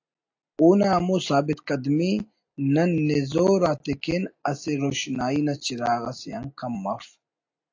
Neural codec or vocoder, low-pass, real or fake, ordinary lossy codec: none; 7.2 kHz; real; AAC, 48 kbps